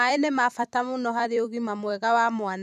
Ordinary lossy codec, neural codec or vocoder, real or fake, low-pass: none; vocoder, 44.1 kHz, 128 mel bands every 256 samples, BigVGAN v2; fake; 14.4 kHz